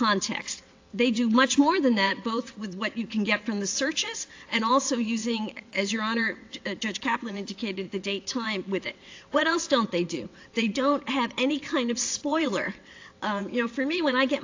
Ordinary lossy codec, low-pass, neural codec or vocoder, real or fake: AAC, 48 kbps; 7.2 kHz; vocoder, 22.05 kHz, 80 mel bands, WaveNeXt; fake